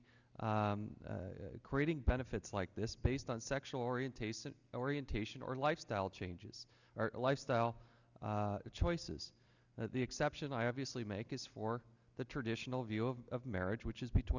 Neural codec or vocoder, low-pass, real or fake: none; 7.2 kHz; real